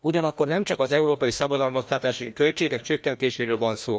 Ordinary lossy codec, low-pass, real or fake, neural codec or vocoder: none; none; fake; codec, 16 kHz, 1 kbps, FreqCodec, larger model